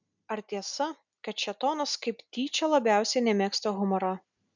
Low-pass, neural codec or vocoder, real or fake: 7.2 kHz; none; real